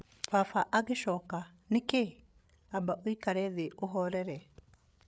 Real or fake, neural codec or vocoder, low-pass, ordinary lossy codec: fake; codec, 16 kHz, 8 kbps, FreqCodec, larger model; none; none